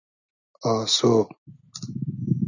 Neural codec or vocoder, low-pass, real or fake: none; 7.2 kHz; real